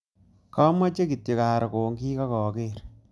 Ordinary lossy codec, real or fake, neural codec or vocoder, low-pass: none; real; none; none